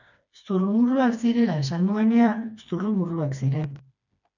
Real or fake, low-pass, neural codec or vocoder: fake; 7.2 kHz; codec, 16 kHz, 2 kbps, FreqCodec, smaller model